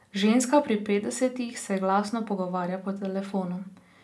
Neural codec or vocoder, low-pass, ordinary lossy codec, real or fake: none; none; none; real